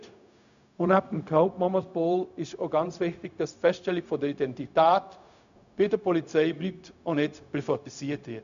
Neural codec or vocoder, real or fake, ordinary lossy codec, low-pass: codec, 16 kHz, 0.4 kbps, LongCat-Audio-Codec; fake; none; 7.2 kHz